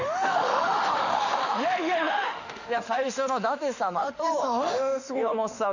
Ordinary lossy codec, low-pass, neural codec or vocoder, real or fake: none; 7.2 kHz; autoencoder, 48 kHz, 32 numbers a frame, DAC-VAE, trained on Japanese speech; fake